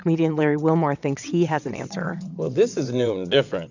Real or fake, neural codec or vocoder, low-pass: fake; vocoder, 22.05 kHz, 80 mel bands, WaveNeXt; 7.2 kHz